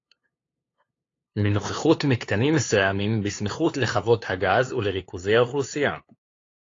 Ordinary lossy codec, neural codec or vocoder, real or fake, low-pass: AAC, 32 kbps; codec, 16 kHz, 2 kbps, FunCodec, trained on LibriTTS, 25 frames a second; fake; 7.2 kHz